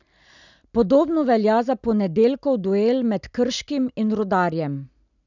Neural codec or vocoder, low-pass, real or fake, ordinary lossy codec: none; 7.2 kHz; real; none